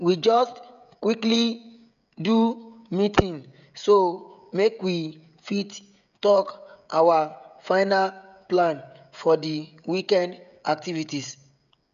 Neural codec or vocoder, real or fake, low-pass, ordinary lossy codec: codec, 16 kHz, 16 kbps, FreqCodec, smaller model; fake; 7.2 kHz; none